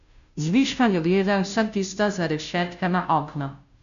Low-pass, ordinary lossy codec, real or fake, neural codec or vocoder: 7.2 kHz; AAC, 96 kbps; fake; codec, 16 kHz, 0.5 kbps, FunCodec, trained on Chinese and English, 25 frames a second